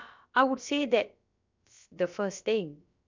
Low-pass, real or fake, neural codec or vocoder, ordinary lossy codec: 7.2 kHz; fake; codec, 16 kHz, about 1 kbps, DyCAST, with the encoder's durations; MP3, 64 kbps